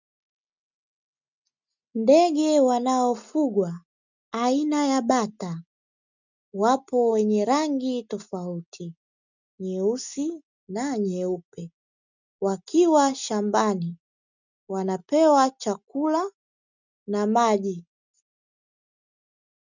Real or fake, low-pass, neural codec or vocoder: real; 7.2 kHz; none